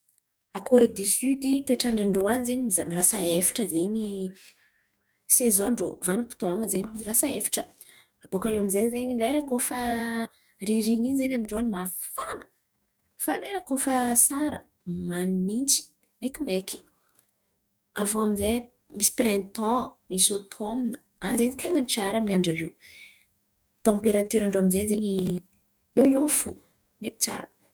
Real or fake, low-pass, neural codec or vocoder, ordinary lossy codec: fake; none; codec, 44.1 kHz, 2.6 kbps, DAC; none